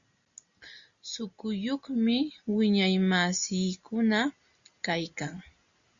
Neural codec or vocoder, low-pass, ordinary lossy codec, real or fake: none; 7.2 kHz; Opus, 64 kbps; real